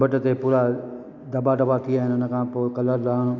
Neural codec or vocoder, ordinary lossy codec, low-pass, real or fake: none; none; 7.2 kHz; real